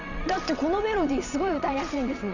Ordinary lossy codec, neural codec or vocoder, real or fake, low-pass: none; vocoder, 22.05 kHz, 80 mel bands, WaveNeXt; fake; 7.2 kHz